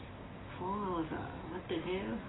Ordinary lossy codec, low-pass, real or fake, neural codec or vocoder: AAC, 16 kbps; 7.2 kHz; real; none